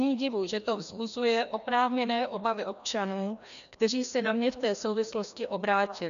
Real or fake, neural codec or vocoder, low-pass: fake; codec, 16 kHz, 1 kbps, FreqCodec, larger model; 7.2 kHz